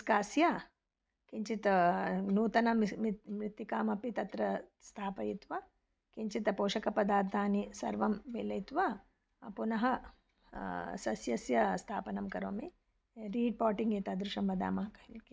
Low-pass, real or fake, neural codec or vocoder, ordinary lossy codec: none; real; none; none